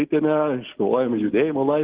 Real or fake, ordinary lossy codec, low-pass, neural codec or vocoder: real; Opus, 16 kbps; 3.6 kHz; none